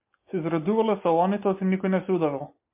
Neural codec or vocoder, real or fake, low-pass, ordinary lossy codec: none; real; 3.6 kHz; MP3, 24 kbps